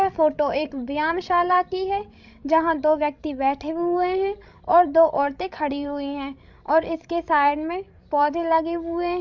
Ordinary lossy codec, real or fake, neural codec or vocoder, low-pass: MP3, 64 kbps; fake; codec, 16 kHz, 16 kbps, FreqCodec, larger model; 7.2 kHz